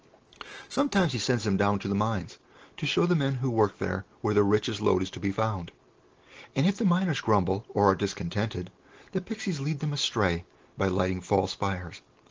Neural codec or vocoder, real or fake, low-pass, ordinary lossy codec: none; real; 7.2 kHz; Opus, 16 kbps